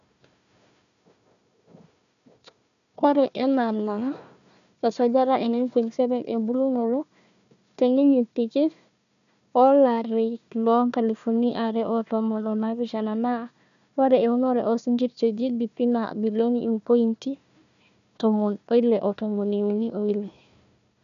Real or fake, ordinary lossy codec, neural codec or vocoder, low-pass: fake; AAC, 96 kbps; codec, 16 kHz, 1 kbps, FunCodec, trained on Chinese and English, 50 frames a second; 7.2 kHz